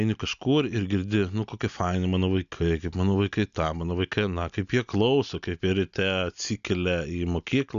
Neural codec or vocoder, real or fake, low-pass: none; real; 7.2 kHz